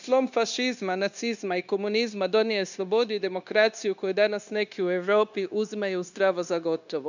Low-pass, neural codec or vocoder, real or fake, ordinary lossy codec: 7.2 kHz; codec, 16 kHz, 0.9 kbps, LongCat-Audio-Codec; fake; none